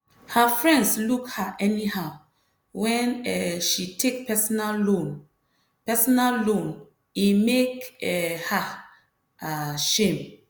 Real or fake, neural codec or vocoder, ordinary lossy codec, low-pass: real; none; none; none